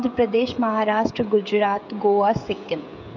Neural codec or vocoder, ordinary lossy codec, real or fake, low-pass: codec, 16 kHz, 16 kbps, FreqCodec, smaller model; none; fake; 7.2 kHz